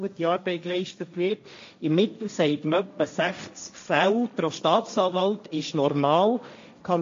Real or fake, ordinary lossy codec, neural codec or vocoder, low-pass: fake; MP3, 48 kbps; codec, 16 kHz, 1.1 kbps, Voila-Tokenizer; 7.2 kHz